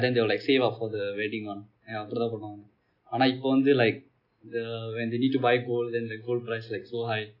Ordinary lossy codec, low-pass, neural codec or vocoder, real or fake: none; 5.4 kHz; none; real